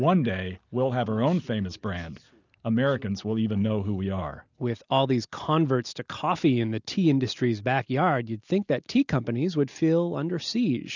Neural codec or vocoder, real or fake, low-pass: none; real; 7.2 kHz